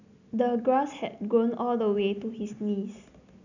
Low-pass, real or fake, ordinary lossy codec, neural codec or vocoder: 7.2 kHz; real; none; none